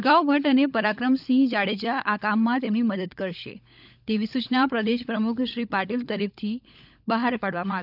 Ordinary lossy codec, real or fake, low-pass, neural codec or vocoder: none; fake; 5.4 kHz; codec, 16 kHz, 16 kbps, FunCodec, trained on LibriTTS, 50 frames a second